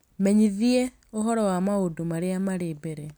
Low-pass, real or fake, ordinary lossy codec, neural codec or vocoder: none; real; none; none